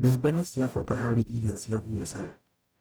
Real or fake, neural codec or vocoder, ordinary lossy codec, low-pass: fake; codec, 44.1 kHz, 0.9 kbps, DAC; none; none